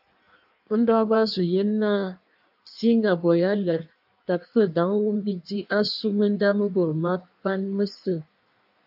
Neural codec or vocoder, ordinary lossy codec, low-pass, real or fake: codec, 16 kHz in and 24 kHz out, 1.1 kbps, FireRedTTS-2 codec; AAC, 48 kbps; 5.4 kHz; fake